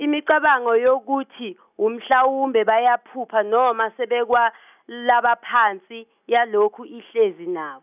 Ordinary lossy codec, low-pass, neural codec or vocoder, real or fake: none; 3.6 kHz; none; real